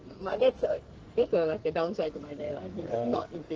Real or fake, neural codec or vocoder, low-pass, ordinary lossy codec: fake; codec, 44.1 kHz, 2.6 kbps, SNAC; 7.2 kHz; Opus, 16 kbps